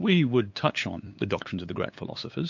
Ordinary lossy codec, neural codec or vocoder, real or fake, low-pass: MP3, 48 kbps; codec, 16 kHz, 8 kbps, FunCodec, trained on LibriTTS, 25 frames a second; fake; 7.2 kHz